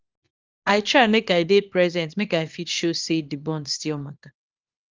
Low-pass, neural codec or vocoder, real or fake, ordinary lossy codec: 7.2 kHz; codec, 24 kHz, 0.9 kbps, WavTokenizer, small release; fake; Opus, 32 kbps